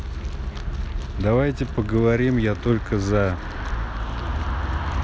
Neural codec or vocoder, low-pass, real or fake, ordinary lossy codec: none; none; real; none